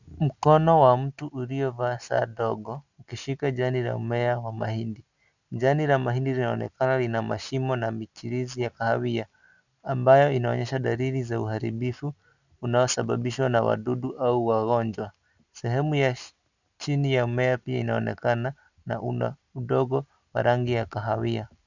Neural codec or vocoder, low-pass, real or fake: none; 7.2 kHz; real